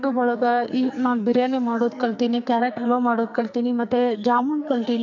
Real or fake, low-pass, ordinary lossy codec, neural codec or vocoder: fake; 7.2 kHz; none; codec, 44.1 kHz, 2.6 kbps, SNAC